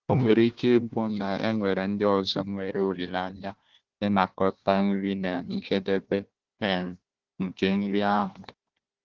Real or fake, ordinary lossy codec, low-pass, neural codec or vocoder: fake; Opus, 16 kbps; 7.2 kHz; codec, 16 kHz, 1 kbps, FunCodec, trained on Chinese and English, 50 frames a second